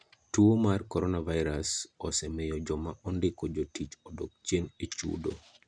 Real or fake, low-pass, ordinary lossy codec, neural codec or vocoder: real; 9.9 kHz; none; none